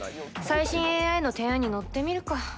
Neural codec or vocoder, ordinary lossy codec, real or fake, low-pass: none; none; real; none